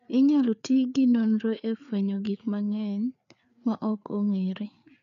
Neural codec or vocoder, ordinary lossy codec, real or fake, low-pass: codec, 16 kHz, 4 kbps, FreqCodec, larger model; none; fake; 7.2 kHz